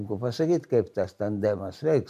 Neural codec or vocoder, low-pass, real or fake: vocoder, 44.1 kHz, 128 mel bands every 512 samples, BigVGAN v2; 14.4 kHz; fake